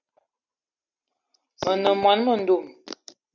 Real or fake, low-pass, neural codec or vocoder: real; 7.2 kHz; none